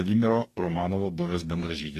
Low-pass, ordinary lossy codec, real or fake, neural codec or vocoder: 14.4 kHz; AAC, 48 kbps; fake; codec, 44.1 kHz, 2.6 kbps, DAC